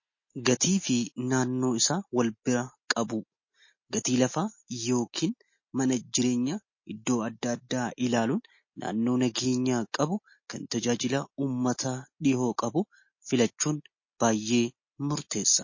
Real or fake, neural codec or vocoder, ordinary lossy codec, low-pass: real; none; MP3, 32 kbps; 7.2 kHz